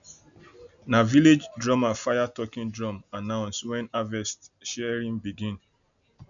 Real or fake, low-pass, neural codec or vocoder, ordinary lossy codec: real; 7.2 kHz; none; none